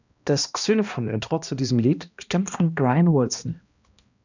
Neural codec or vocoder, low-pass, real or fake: codec, 16 kHz, 1 kbps, X-Codec, HuBERT features, trained on balanced general audio; 7.2 kHz; fake